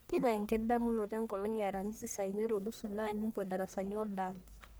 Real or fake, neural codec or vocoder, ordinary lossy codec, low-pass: fake; codec, 44.1 kHz, 1.7 kbps, Pupu-Codec; none; none